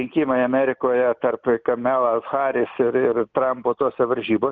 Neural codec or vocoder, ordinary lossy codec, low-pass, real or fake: none; Opus, 24 kbps; 7.2 kHz; real